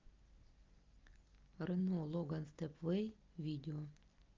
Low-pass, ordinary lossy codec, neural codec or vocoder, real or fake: 7.2 kHz; Opus, 24 kbps; none; real